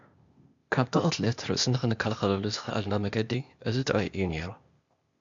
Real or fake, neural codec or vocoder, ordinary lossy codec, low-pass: fake; codec, 16 kHz, 0.8 kbps, ZipCodec; MP3, 64 kbps; 7.2 kHz